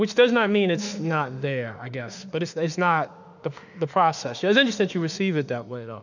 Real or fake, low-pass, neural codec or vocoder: fake; 7.2 kHz; autoencoder, 48 kHz, 32 numbers a frame, DAC-VAE, trained on Japanese speech